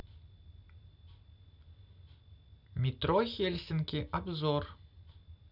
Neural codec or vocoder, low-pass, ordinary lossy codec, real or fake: none; 5.4 kHz; none; real